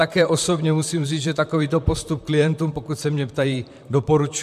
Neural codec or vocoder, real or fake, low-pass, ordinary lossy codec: vocoder, 44.1 kHz, 128 mel bands, Pupu-Vocoder; fake; 14.4 kHz; MP3, 96 kbps